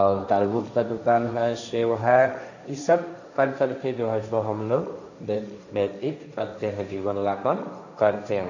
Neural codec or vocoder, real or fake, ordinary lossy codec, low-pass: codec, 16 kHz, 1.1 kbps, Voila-Tokenizer; fake; none; 7.2 kHz